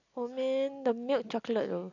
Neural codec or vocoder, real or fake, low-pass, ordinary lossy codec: none; real; 7.2 kHz; none